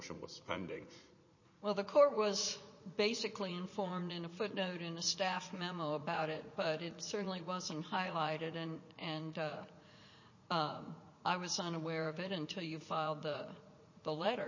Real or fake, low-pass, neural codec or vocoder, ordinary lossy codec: fake; 7.2 kHz; vocoder, 44.1 kHz, 80 mel bands, Vocos; MP3, 32 kbps